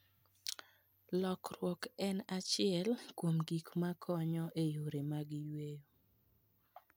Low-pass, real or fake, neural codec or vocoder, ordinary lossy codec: none; real; none; none